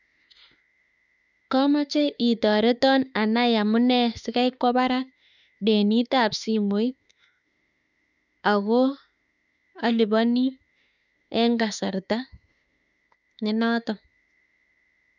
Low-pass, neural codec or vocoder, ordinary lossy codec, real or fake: 7.2 kHz; autoencoder, 48 kHz, 32 numbers a frame, DAC-VAE, trained on Japanese speech; none; fake